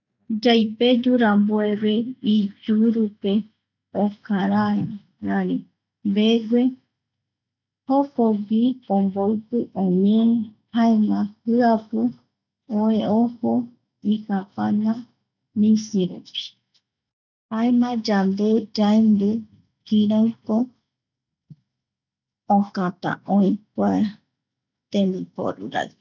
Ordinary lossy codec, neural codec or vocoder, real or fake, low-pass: none; none; real; 7.2 kHz